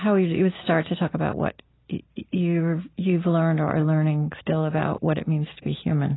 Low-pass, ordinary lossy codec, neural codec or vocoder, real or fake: 7.2 kHz; AAC, 16 kbps; none; real